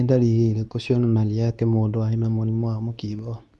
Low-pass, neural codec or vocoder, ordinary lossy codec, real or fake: none; codec, 24 kHz, 0.9 kbps, WavTokenizer, medium speech release version 2; none; fake